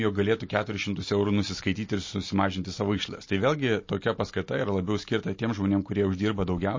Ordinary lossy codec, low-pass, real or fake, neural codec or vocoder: MP3, 32 kbps; 7.2 kHz; real; none